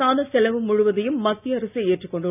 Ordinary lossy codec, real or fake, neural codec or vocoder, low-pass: none; real; none; 3.6 kHz